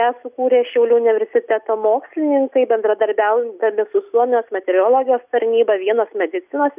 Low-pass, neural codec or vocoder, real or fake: 3.6 kHz; none; real